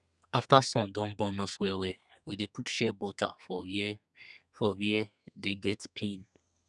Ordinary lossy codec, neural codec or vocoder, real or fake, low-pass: none; codec, 32 kHz, 1.9 kbps, SNAC; fake; 10.8 kHz